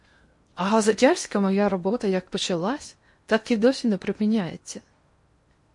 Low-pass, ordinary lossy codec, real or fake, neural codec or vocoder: 10.8 kHz; MP3, 48 kbps; fake; codec, 16 kHz in and 24 kHz out, 0.8 kbps, FocalCodec, streaming, 65536 codes